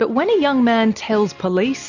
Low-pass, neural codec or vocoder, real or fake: 7.2 kHz; none; real